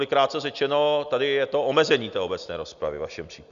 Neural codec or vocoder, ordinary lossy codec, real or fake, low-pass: none; Opus, 64 kbps; real; 7.2 kHz